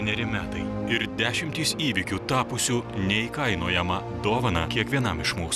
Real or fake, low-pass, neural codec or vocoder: real; 14.4 kHz; none